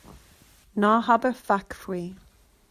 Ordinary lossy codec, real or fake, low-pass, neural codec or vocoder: Opus, 64 kbps; real; 14.4 kHz; none